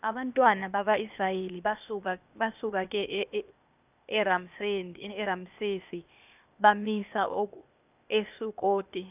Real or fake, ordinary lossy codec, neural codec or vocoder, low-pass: fake; none; codec, 16 kHz, 0.8 kbps, ZipCodec; 3.6 kHz